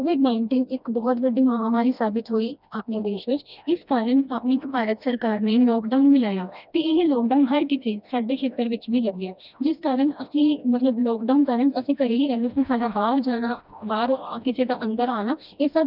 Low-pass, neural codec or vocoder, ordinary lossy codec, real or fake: 5.4 kHz; codec, 16 kHz, 1 kbps, FreqCodec, smaller model; none; fake